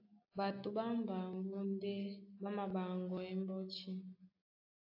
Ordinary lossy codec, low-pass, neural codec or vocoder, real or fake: AAC, 32 kbps; 5.4 kHz; none; real